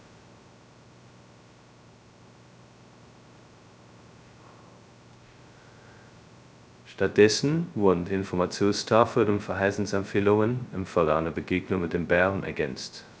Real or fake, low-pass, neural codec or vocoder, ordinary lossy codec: fake; none; codec, 16 kHz, 0.2 kbps, FocalCodec; none